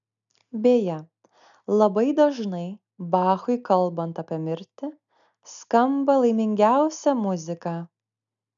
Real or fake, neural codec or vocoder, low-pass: real; none; 7.2 kHz